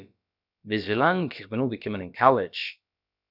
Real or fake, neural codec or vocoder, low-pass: fake; codec, 16 kHz, about 1 kbps, DyCAST, with the encoder's durations; 5.4 kHz